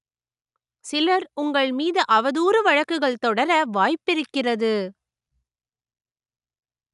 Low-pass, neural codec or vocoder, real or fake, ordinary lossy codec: 10.8 kHz; none; real; none